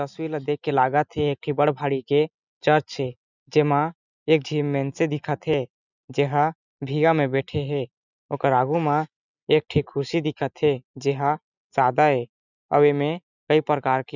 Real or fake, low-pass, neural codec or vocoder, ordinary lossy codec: real; 7.2 kHz; none; none